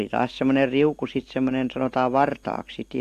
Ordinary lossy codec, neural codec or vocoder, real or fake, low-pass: AAC, 64 kbps; none; real; 14.4 kHz